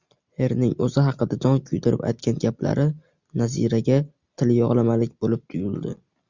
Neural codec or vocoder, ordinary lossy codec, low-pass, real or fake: none; Opus, 64 kbps; 7.2 kHz; real